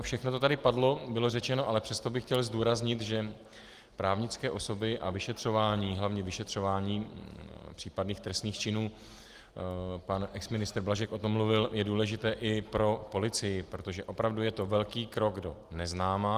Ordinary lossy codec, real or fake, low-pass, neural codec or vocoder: Opus, 24 kbps; real; 14.4 kHz; none